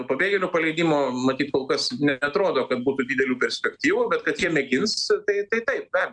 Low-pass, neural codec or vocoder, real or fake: 9.9 kHz; none; real